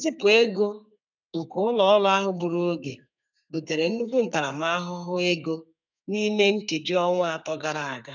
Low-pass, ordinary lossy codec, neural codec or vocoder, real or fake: 7.2 kHz; none; codec, 32 kHz, 1.9 kbps, SNAC; fake